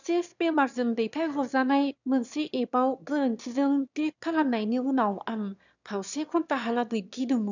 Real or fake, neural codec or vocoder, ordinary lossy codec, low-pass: fake; autoencoder, 22.05 kHz, a latent of 192 numbers a frame, VITS, trained on one speaker; none; 7.2 kHz